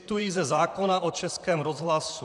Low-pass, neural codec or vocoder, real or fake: 10.8 kHz; vocoder, 44.1 kHz, 128 mel bands, Pupu-Vocoder; fake